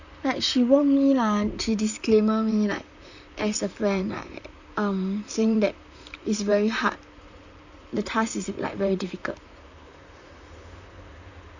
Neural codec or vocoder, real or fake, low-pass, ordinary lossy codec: vocoder, 44.1 kHz, 128 mel bands, Pupu-Vocoder; fake; 7.2 kHz; none